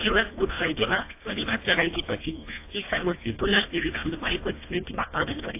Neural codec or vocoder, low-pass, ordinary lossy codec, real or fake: codec, 24 kHz, 1.5 kbps, HILCodec; 3.6 kHz; AAC, 24 kbps; fake